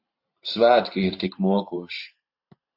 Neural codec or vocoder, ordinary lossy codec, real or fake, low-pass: vocoder, 44.1 kHz, 128 mel bands every 512 samples, BigVGAN v2; AAC, 48 kbps; fake; 5.4 kHz